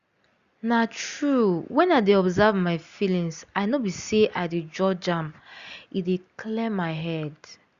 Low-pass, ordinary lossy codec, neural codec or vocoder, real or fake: 7.2 kHz; Opus, 64 kbps; none; real